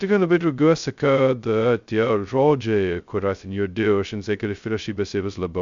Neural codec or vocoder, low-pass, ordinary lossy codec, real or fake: codec, 16 kHz, 0.2 kbps, FocalCodec; 7.2 kHz; Opus, 64 kbps; fake